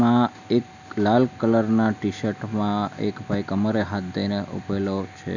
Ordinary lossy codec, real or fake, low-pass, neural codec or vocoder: none; real; 7.2 kHz; none